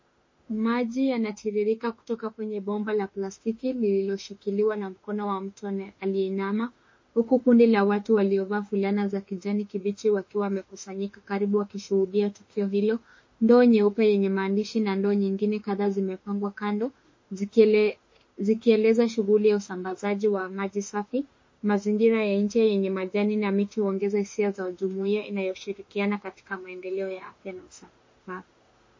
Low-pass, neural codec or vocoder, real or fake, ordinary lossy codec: 7.2 kHz; autoencoder, 48 kHz, 32 numbers a frame, DAC-VAE, trained on Japanese speech; fake; MP3, 32 kbps